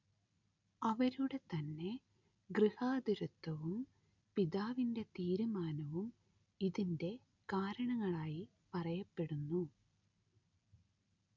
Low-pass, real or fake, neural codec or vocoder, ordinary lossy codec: 7.2 kHz; real; none; none